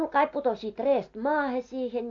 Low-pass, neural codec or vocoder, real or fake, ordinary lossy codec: 7.2 kHz; none; real; AAC, 48 kbps